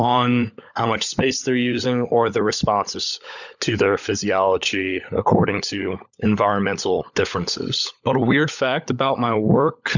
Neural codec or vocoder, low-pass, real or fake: codec, 16 kHz, 16 kbps, FunCodec, trained on LibriTTS, 50 frames a second; 7.2 kHz; fake